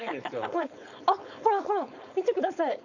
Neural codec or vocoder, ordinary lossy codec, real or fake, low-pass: codec, 16 kHz, 4.8 kbps, FACodec; none; fake; 7.2 kHz